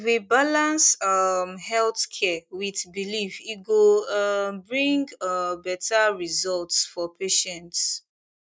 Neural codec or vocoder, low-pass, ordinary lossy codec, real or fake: none; none; none; real